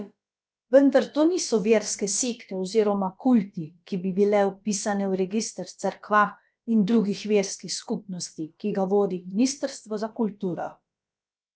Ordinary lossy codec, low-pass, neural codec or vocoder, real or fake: none; none; codec, 16 kHz, about 1 kbps, DyCAST, with the encoder's durations; fake